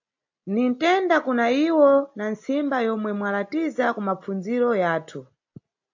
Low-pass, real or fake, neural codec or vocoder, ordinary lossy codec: 7.2 kHz; real; none; AAC, 48 kbps